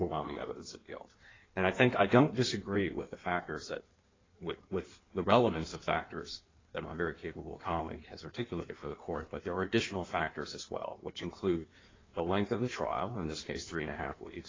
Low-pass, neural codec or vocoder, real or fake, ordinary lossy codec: 7.2 kHz; codec, 16 kHz in and 24 kHz out, 1.1 kbps, FireRedTTS-2 codec; fake; AAC, 32 kbps